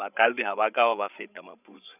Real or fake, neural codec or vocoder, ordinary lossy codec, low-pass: fake; codec, 16 kHz, 16 kbps, FreqCodec, larger model; none; 3.6 kHz